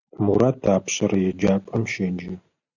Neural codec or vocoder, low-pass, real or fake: none; 7.2 kHz; real